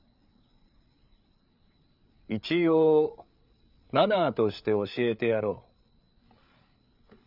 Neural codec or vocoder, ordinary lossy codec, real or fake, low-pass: codec, 16 kHz, 8 kbps, FreqCodec, larger model; none; fake; 5.4 kHz